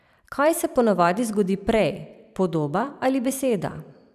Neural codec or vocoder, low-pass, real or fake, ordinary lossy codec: vocoder, 44.1 kHz, 128 mel bands every 512 samples, BigVGAN v2; 14.4 kHz; fake; none